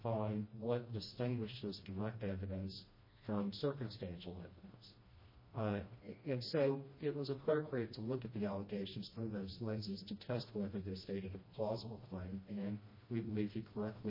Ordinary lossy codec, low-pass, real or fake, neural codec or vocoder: MP3, 24 kbps; 5.4 kHz; fake; codec, 16 kHz, 1 kbps, FreqCodec, smaller model